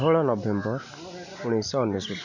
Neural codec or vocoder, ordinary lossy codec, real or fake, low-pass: none; none; real; 7.2 kHz